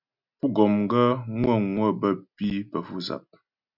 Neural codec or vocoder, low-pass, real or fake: none; 5.4 kHz; real